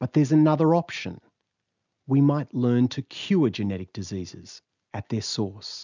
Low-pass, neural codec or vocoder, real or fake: 7.2 kHz; none; real